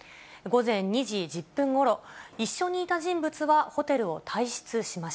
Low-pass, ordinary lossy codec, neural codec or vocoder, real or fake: none; none; none; real